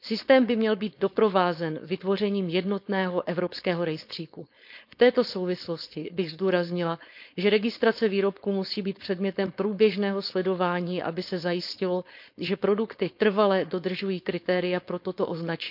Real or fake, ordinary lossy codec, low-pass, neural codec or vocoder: fake; none; 5.4 kHz; codec, 16 kHz, 4.8 kbps, FACodec